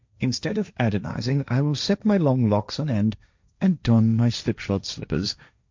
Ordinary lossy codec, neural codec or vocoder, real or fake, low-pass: MP3, 64 kbps; codec, 16 kHz, 1.1 kbps, Voila-Tokenizer; fake; 7.2 kHz